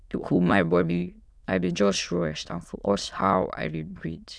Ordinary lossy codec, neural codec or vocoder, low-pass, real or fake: none; autoencoder, 22.05 kHz, a latent of 192 numbers a frame, VITS, trained on many speakers; none; fake